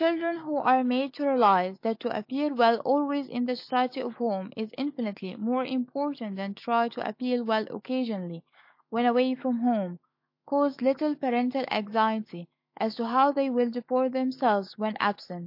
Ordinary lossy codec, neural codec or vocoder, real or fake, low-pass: MP3, 32 kbps; autoencoder, 48 kHz, 128 numbers a frame, DAC-VAE, trained on Japanese speech; fake; 5.4 kHz